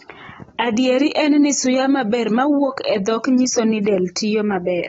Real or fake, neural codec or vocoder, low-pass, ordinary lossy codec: real; none; 14.4 kHz; AAC, 24 kbps